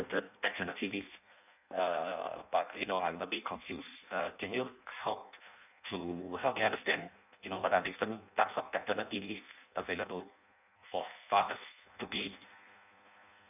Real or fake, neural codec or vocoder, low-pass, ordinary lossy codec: fake; codec, 16 kHz in and 24 kHz out, 0.6 kbps, FireRedTTS-2 codec; 3.6 kHz; none